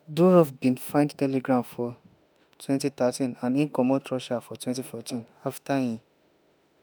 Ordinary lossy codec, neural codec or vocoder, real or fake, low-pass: none; autoencoder, 48 kHz, 32 numbers a frame, DAC-VAE, trained on Japanese speech; fake; none